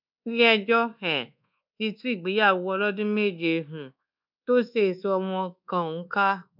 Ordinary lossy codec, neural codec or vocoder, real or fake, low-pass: none; codec, 24 kHz, 1.2 kbps, DualCodec; fake; 5.4 kHz